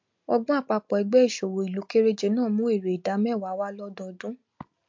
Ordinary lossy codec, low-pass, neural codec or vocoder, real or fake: MP3, 48 kbps; 7.2 kHz; none; real